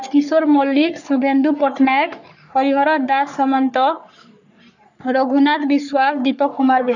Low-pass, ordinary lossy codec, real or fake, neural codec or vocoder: 7.2 kHz; none; fake; codec, 44.1 kHz, 3.4 kbps, Pupu-Codec